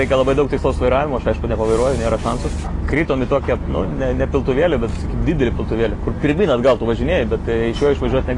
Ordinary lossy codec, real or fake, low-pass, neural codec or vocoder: AAC, 32 kbps; real; 10.8 kHz; none